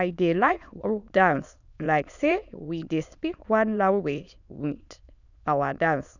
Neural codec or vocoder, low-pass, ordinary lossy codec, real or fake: autoencoder, 22.05 kHz, a latent of 192 numbers a frame, VITS, trained on many speakers; 7.2 kHz; none; fake